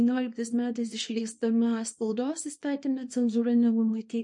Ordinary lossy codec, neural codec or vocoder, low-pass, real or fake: MP3, 48 kbps; codec, 24 kHz, 0.9 kbps, WavTokenizer, small release; 10.8 kHz; fake